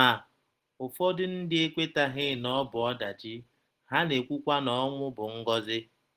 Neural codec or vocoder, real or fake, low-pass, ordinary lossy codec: none; real; 14.4 kHz; Opus, 16 kbps